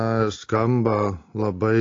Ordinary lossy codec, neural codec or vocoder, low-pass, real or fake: AAC, 32 kbps; none; 7.2 kHz; real